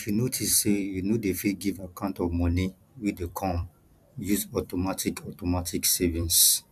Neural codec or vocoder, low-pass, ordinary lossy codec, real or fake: vocoder, 44.1 kHz, 128 mel bands every 256 samples, BigVGAN v2; 14.4 kHz; none; fake